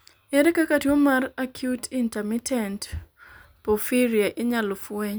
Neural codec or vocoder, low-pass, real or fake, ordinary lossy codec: none; none; real; none